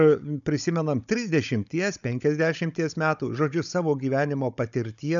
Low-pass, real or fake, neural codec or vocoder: 7.2 kHz; fake; codec, 16 kHz, 16 kbps, FunCodec, trained on Chinese and English, 50 frames a second